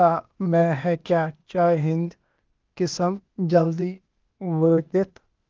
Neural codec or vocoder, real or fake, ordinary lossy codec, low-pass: codec, 16 kHz, 0.8 kbps, ZipCodec; fake; Opus, 24 kbps; 7.2 kHz